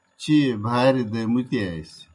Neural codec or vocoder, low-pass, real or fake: none; 10.8 kHz; real